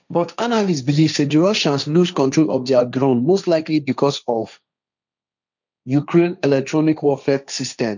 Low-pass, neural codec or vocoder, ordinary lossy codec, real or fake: 7.2 kHz; codec, 16 kHz, 1.1 kbps, Voila-Tokenizer; none; fake